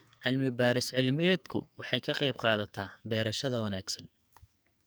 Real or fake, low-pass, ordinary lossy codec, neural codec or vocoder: fake; none; none; codec, 44.1 kHz, 2.6 kbps, SNAC